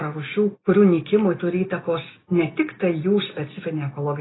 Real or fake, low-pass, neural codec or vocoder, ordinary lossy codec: real; 7.2 kHz; none; AAC, 16 kbps